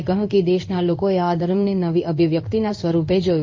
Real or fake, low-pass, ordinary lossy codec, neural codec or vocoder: fake; 7.2 kHz; Opus, 24 kbps; codec, 16 kHz in and 24 kHz out, 1 kbps, XY-Tokenizer